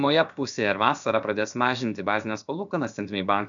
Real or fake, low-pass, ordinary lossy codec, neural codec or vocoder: fake; 7.2 kHz; MP3, 96 kbps; codec, 16 kHz, about 1 kbps, DyCAST, with the encoder's durations